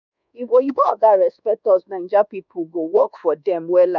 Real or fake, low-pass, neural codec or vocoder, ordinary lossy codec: fake; 7.2 kHz; codec, 24 kHz, 1.2 kbps, DualCodec; none